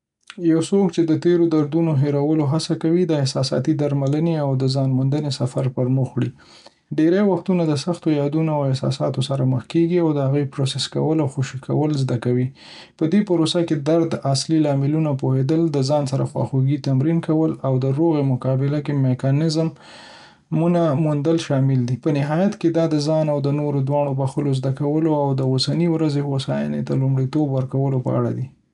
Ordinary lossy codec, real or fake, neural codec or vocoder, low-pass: none; real; none; 10.8 kHz